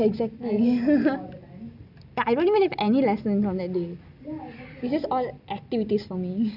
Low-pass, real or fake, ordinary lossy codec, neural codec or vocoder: 5.4 kHz; real; none; none